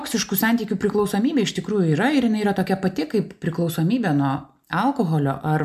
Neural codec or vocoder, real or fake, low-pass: none; real; 14.4 kHz